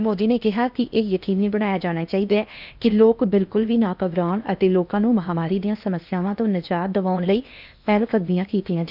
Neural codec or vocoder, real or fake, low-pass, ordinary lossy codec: codec, 16 kHz in and 24 kHz out, 0.8 kbps, FocalCodec, streaming, 65536 codes; fake; 5.4 kHz; none